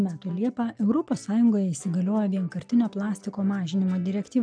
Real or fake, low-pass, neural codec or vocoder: real; 9.9 kHz; none